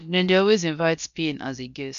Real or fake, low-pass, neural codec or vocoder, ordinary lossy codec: fake; 7.2 kHz; codec, 16 kHz, about 1 kbps, DyCAST, with the encoder's durations; none